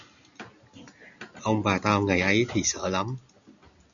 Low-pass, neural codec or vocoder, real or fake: 7.2 kHz; none; real